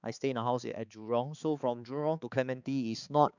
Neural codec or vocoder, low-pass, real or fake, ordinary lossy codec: codec, 16 kHz, 4 kbps, X-Codec, HuBERT features, trained on balanced general audio; 7.2 kHz; fake; none